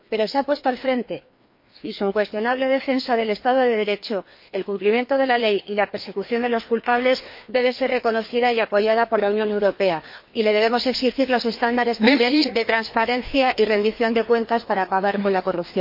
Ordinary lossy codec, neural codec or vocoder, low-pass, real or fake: MP3, 32 kbps; codec, 16 kHz, 2 kbps, FreqCodec, larger model; 5.4 kHz; fake